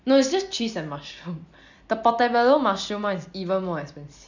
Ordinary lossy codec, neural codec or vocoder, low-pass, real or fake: none; none; 7.2 kHz; real